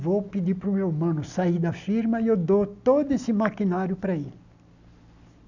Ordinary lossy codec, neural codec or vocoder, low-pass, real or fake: none; none; 7.2 kHz; real